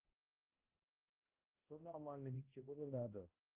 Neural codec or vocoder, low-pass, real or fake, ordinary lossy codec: codec, 16 kHz, 0.5 kbps, X-Codec, HuBERT features, trained on balanced general audio; 3.6 kHz; fake; Opus, 24 kbps